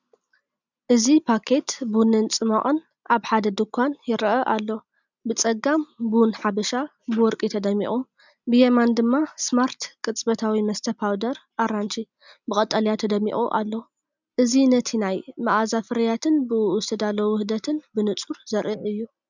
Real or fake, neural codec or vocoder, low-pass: real; none; 7.2 kHz